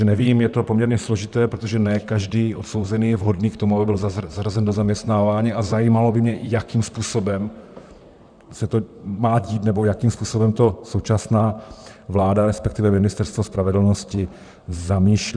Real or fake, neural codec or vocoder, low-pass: fake; vocoder, 44.1 kHz, 128 mel bands, Pupu-Vocoder; 9.9 kHz